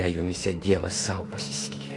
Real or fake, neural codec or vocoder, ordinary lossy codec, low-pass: fake; autoencoder, 48 kHz, 32 numbers a frame, DAC-VAE, trained on Japanese speech; AAC, 48 kbps; 10.8 kHz